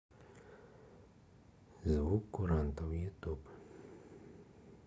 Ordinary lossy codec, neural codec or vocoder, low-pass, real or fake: none; none; none; real